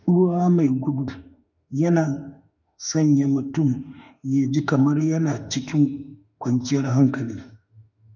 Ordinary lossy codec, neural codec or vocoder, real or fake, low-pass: none; autoencoder, 48 kHz, 32 numbers a frame, DAC-VAE, trained on Japanese speech; fake; 7.2 kHz